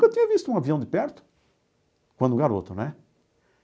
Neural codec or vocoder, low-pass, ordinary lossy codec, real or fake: none; none; none; real